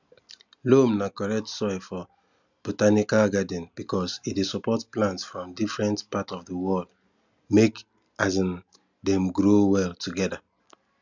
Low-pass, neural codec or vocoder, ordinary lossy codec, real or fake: 7.2 kHz; none; none; real